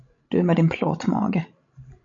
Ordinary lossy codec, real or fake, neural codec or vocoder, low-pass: MP3, 48 kbps; fake; codec, 16 kHz, 16 kbps, FreqCodec, larger model; 7.2 kHz